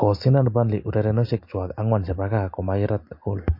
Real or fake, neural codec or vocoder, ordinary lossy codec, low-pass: real; none; MP3, 32 kbps; 5.4 kHz